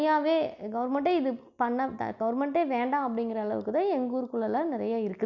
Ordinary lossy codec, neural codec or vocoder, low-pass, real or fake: none; none; 7.2 kHz; real